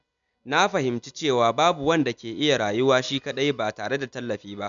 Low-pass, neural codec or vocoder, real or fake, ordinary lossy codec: 7.2 kHz; none; real; none